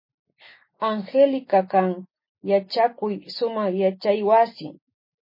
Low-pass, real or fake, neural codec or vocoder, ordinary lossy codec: 5.4 kHz; real; none; MP3, 24 kbps